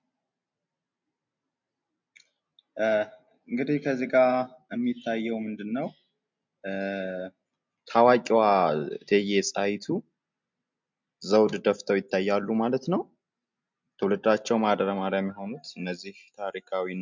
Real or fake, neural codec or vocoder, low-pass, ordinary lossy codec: real; none; 7.2 kHz; MP3, 64 kbps